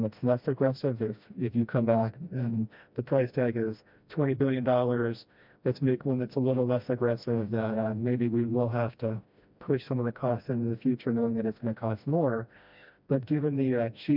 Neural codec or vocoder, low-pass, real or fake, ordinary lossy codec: codec, 16 kHz, 1 kbps, FreqCodec, smaller model; 5.4 kHz; fake; MP3, 48 kbps